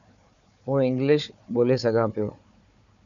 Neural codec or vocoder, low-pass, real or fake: codec, 16 kHz, 4 kbps, FunCodec, trained on Chinese and English, 50 frames a second; 7.2 kHz; fake